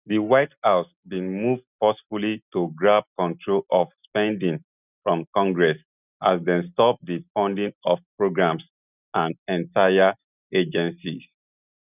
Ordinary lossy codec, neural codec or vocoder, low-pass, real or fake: none; none; 3.6 kHz; real